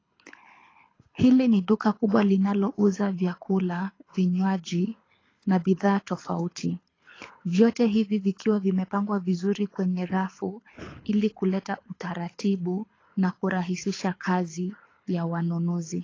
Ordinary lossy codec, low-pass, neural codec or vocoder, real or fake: AAC, 32 kbps; 7.2 kHz; codec, 24 kHz, 6 kbps, HILCodec; fake